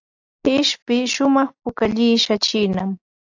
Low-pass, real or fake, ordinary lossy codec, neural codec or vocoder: 7.2 kHz; real; AAC, 48 kbps; none